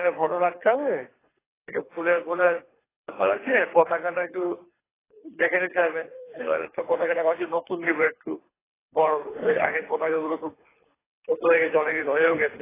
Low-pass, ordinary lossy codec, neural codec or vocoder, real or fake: 3.6 kHz; AAC, 16 kbps; codec, 24 kHz, 3 kbps, HILCodec; fake